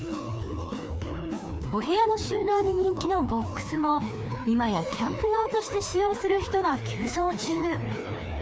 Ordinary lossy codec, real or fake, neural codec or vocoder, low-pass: none; fake; codec, 16 kHz, 2 kbps, FreqCodec, larger model; none